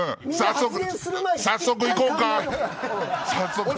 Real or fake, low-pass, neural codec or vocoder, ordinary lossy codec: real; none; none; none